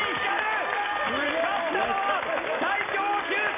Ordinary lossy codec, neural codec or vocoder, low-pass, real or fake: AAC, 16 kbps; none; 3.6 kHz; real